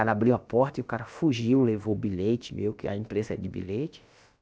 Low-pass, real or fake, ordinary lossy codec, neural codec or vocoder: none; fake; none; codec, 16 kHz, about 1 kbps, DyCAST, with the encoder's durations